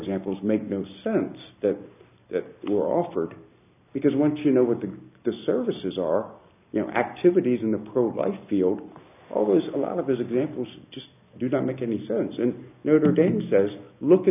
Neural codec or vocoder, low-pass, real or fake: none; 3.6 kHz; real